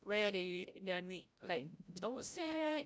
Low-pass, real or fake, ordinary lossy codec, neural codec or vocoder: none; fake; none; codec, 16 kHz, 0.5 kbps, FreqCodec, larger model